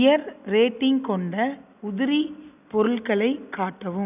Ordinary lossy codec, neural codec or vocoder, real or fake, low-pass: none; none; real; 3.6 kHz